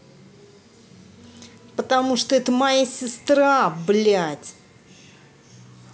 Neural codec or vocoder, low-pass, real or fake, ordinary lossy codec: none; none; real; none